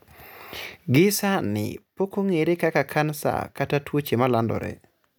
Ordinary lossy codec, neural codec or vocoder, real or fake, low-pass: none; none; real; none